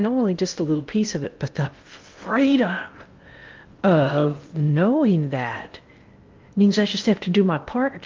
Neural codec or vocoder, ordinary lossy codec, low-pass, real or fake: codec, 16 kHz in and 24 kHz out, 0.6 kbps, FocalCodec, streaming, 2048 codes; Opus, 32 kbps; 7.2 kHz; fake